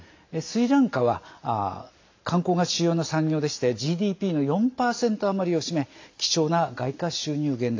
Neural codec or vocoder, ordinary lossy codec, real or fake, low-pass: none; AAC, 48 kbps; real; 7.2 kHz